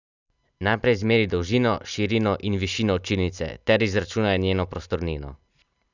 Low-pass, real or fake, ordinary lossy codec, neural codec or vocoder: 7.2 kHz; real; none; none